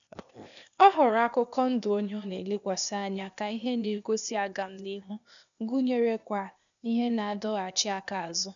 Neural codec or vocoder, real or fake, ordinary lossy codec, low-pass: codec, 16 kHz, 0.8 kbps, ZipCodec; fake; none; 7.2 kHz